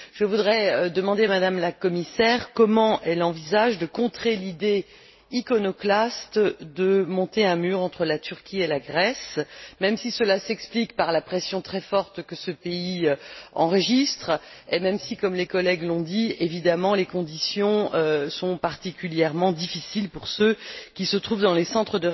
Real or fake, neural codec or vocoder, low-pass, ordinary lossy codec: real; none; 7.2 kHz; MP3, 24 kbps